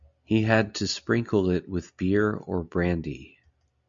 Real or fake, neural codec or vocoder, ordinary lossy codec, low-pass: real; none; MP3, 64 kbps; 7.2 kHz